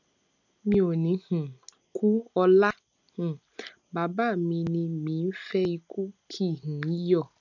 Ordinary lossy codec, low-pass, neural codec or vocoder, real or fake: none; 7.2 kHz; none; real